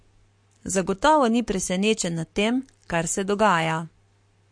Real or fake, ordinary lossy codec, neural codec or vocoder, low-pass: fake; MP3, 48 kbps; codec, 44.1 kHz, 7.8 kbps, Pupu-Codec; 9.9 kHz